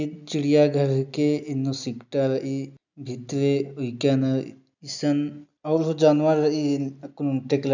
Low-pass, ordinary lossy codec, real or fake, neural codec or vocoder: 7.2 kHz; none; real; none